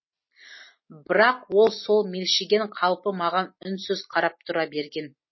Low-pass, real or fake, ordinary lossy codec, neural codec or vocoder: 7.2 kHz; real; MP3, 24 kbps; none